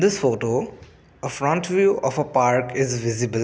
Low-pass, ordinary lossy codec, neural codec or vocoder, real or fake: none; none; none; real